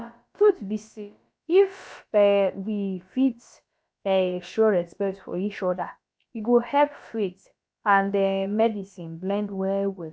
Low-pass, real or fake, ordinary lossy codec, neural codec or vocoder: none; fake; none; codec, 16 kHz, about 1 kbps, DyCAST, with the encoder's durations